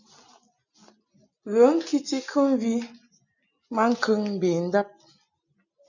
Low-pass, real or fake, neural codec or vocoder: 7.2 kHz; real; none